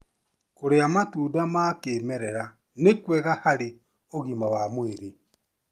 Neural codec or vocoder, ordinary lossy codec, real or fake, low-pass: none; Opus, 32 kbps; real; 14.4 kHz